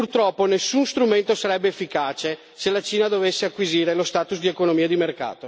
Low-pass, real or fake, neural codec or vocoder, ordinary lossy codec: none; real; none; none